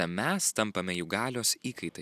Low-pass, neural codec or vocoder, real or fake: 14.4 kHz; none; real